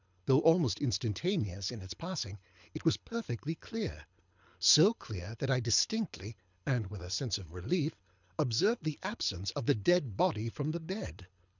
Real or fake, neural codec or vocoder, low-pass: fake; codec, 24 kHz, 6 kbps, HILCodec; 7.2 kHz